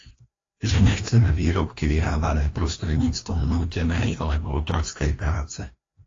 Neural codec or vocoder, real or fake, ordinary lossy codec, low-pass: codec, 16 kHz, 1 kbps, FreqCodec, larger model; fake; AAC, 32 kbps; 7.2 kHz